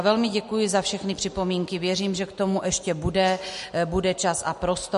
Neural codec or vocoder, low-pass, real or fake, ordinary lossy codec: none; 14.4 kHz; real; MP3, 48 kbps